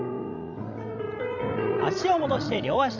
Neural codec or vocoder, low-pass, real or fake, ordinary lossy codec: codec, 16 kHz, 16 kbps, FreqCodec, larger model; 7.2 kHz; fake; none